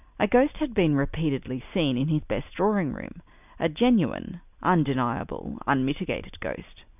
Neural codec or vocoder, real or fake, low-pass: none; real; 3.6 kHz